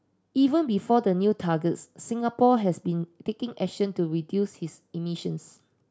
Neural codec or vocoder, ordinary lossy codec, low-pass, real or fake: none; none; none; real